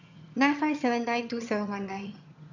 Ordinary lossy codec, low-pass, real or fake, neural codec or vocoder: none; 7.2 kHz; fake; vocoder, 22.05 kHz, 80 mel bands, HiFi-GAN